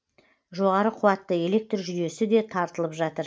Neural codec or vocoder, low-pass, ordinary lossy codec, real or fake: none; none; none; real